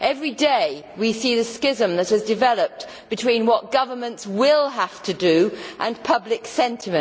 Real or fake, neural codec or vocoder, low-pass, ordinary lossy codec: real; none; none; none